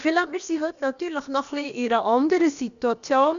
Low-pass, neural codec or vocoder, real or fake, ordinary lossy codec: 7.2 kHz; codec, 16 kHz, about 1 kbps, DyCAST, with the encoder's durations; fake; none